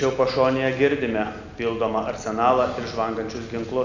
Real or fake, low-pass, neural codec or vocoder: real; 7.2 kHz; none